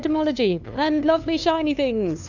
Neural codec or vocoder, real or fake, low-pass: codec, 16 kHz, 2 kbps, FunCodec, trained on LibriTTS, 25 frames a second; fake; 7.2 kHz